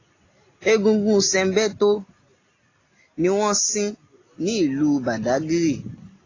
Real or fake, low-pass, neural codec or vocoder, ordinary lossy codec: real; 7.2 kHz; none; AAC, 32 kbps